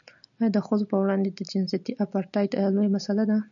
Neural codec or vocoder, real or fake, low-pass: none; real; 7.2 kHz